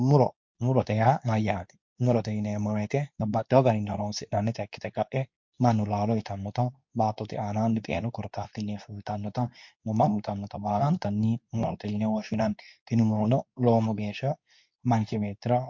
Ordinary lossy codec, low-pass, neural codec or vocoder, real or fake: MP3, 48 kbps; 7.2 kHz; codec, 24 kHz, 0.9 kbps, WavTokenizer, medium speech release version 2; fake